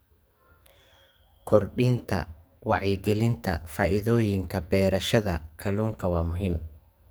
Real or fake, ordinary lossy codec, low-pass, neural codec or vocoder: fake; none; none; codec, 44.1 kHz, 2.6 kbps, SNAC